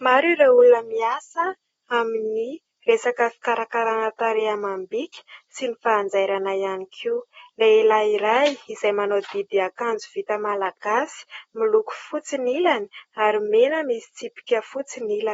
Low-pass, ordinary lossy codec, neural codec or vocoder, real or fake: 19.8 kHz; AAC, 24 kbps; none; real